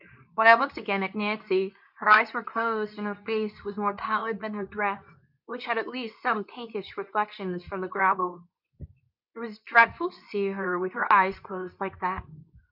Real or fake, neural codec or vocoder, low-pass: fake; codec, 24 kHz, 0.9 kbps, WavTokenizer, medium speech release version 2; 5.4 kHz